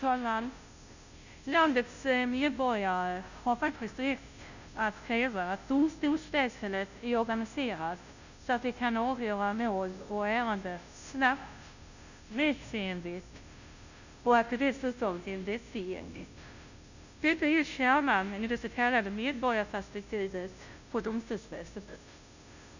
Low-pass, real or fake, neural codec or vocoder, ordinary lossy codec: 7.2 kHz; fake; codec, 16 kHz, 0.5 kbps, FunCodec, trained on Chinese and English, 25 frames a second; none